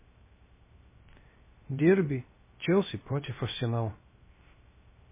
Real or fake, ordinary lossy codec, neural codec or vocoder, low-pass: fake; MP3, 16 kbps; codec, 16 kHz, 0.3 kbps, FocalCodec; 3.6 kHz